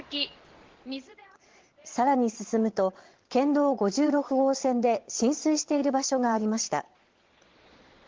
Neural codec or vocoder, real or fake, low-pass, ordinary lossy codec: vocoder, 22.05 kHz, 80 mel bands, Vocos; fake; 7.2 kHz; Opus, 16 kbps